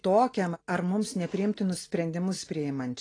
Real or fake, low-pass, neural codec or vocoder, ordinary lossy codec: real; 9.9 kHz; none; AAC, 32 kbps